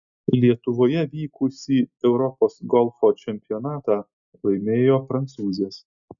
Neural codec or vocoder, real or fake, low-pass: none; real; 7.2 kHz